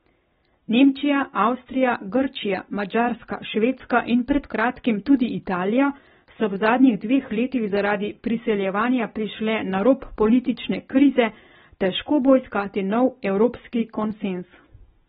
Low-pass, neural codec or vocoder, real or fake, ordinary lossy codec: 19.8 kHz; none; real; AAC, 16 kbps